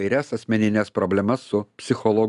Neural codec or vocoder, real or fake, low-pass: none; real; 10.8 kHz